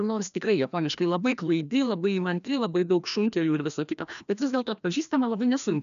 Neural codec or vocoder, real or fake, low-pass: codec, 16 kHz, 1 kbps, FreqCodec, larger model; fake; 7.2 kHz